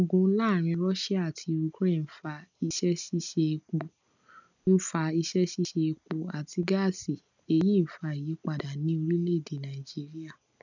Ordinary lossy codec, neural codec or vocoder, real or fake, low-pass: none; none; real; 7.2 kHz